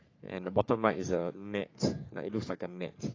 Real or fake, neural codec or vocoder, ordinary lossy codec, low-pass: fake; codec, 44.1 kHz, 3.4 kbps, Pupu-Codec; AAC, 32 kbps; 7.2 kHz